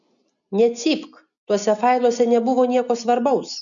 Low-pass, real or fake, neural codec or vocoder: 7.2 kHz; real; none